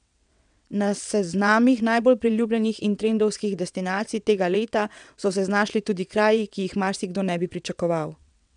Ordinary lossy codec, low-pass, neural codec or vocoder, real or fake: none; 9.9 kHz; vocoder, 22.05 kHz, 80 mel bands, WaveNeXt; fake